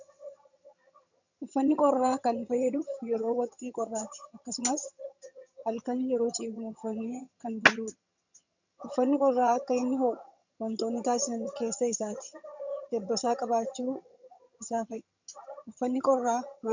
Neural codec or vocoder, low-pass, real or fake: vocoder, 22.05 kHz, 80 mel bands, HiFi-GAN; 7.2 kHz; fake